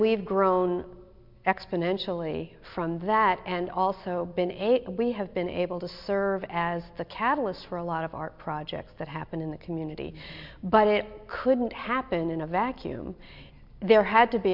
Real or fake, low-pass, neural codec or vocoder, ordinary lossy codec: real; 5.4 kHz; none; MP3, 48 kbps